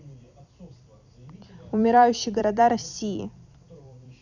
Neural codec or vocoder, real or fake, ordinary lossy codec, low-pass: vocoder, 44.1 kHz, 128 mel bands every 256 samples, BigVGAN v2; fake; none; 7.2 kHz